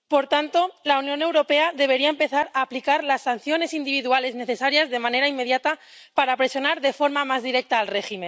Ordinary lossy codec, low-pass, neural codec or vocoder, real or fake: none; none; none; real